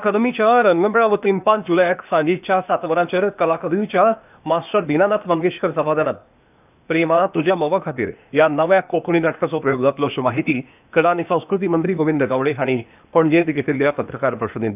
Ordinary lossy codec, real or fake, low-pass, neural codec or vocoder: none; fake; 3.6 kHz; codec, 16 kHz, 0.8 kbps, ZipCodec